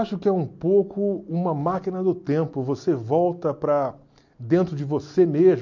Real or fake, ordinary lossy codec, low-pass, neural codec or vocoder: real; MP3, 48 kbps; 7.2 kHz; none